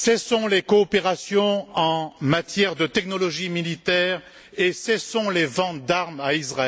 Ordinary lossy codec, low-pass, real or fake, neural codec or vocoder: none; none; real; none